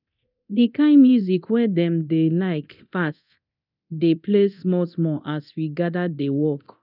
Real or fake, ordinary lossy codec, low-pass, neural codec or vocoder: fake; none; 5.4 kHz; codec, 24 kHz, 0.9 kbps, DualCodec